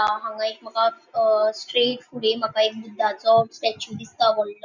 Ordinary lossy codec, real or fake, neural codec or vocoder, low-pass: none; real; none; 7.2 kHz